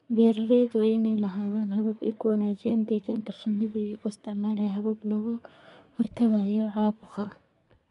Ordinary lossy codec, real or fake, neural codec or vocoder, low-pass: none; fake; codec, 24 kHz, 1 kbps, SNAC; 10.8 kHz